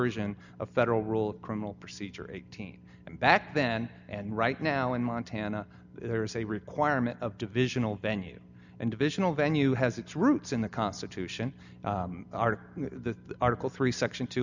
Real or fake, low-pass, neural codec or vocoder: real; 7.2 kHz; none